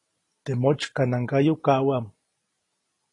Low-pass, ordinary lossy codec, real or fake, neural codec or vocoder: 10.8 kHz; AAC, 32 kbps; real; none